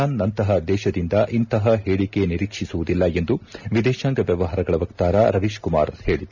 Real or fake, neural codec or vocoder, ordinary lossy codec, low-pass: real; none; none; 7.2 kHz